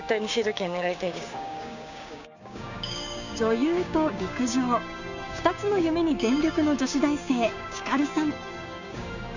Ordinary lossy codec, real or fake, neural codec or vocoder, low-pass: none; fake; codec, 16 kHz, 6 kbps, DAC; 7.2 kHz